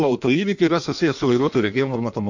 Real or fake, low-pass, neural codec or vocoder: fake; 7.2 kHz; codec, 16 kHz in and 24 kHz out, 1.1 kbps, FireRedTTS-2 codec